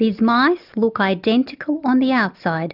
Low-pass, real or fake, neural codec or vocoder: 5.4 kHz; real; none